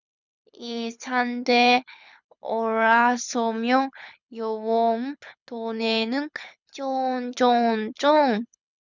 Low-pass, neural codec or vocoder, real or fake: 7.2 kHz; codec, 24 kHz, 6 kbps, HILCodec; fake